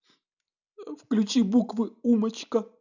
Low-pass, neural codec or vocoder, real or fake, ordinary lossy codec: 7.2 kHz; none; real; none